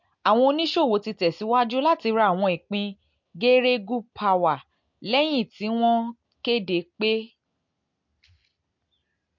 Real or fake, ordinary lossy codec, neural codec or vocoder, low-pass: real; MP3, 48 kbps; none; 7.2 kHz